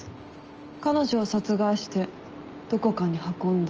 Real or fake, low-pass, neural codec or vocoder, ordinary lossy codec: real; 7.2 kHz; none; Opus, 24 kbps